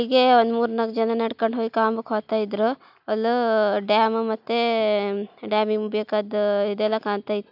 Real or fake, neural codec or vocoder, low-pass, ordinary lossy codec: real; none; 5.4 kHz; none